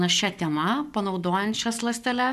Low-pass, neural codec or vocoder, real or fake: 14.4 kHz; codec, 44.1 kHz, 7.8 kbps, Pupu-Codec; fake